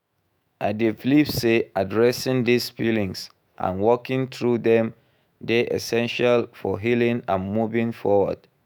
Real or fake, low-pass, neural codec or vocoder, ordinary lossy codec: fake; none; autoencoder, 48 kHz, 128 numbers a frame, DAC-VAE, trained on Japanese speech; none